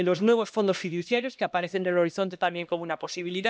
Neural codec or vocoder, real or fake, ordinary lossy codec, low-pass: codec, 16 kHz, 1 kbps, X-Codec, HuBERT features, trained on LibriSpeech; fake; none; none